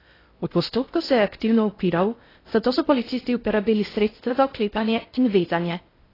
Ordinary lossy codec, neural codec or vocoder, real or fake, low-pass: AAC, 24 kbps; codec, 16 kHz in and 24 kHz out, 0.6 kbps, FocalCodec, streaming, 2048 codes; fake; 5.4 kHz